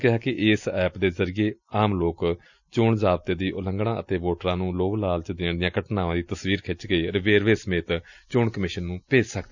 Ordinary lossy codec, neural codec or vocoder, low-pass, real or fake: none; none; 7.2 kHz; real